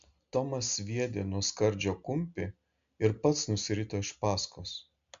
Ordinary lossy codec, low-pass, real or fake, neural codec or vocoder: MP3, 64 kbps; 7.2 kHz; real; none